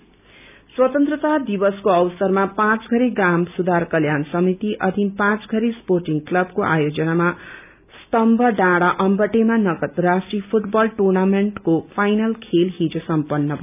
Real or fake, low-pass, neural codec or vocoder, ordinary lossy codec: real; 3.6 kHz; none; none